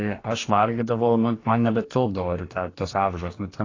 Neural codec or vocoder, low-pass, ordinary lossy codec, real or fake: codec, 44.1 kHz, 2.6 kbps, DAC; 7.2 kHz; AAC, 32 kbps; fake